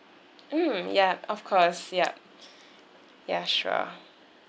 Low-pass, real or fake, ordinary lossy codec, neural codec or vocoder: none; real; none; none